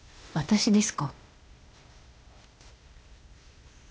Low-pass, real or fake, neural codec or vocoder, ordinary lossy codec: none; fake; codec, 16 kHz, 0.8 kbps, ZipCodec; none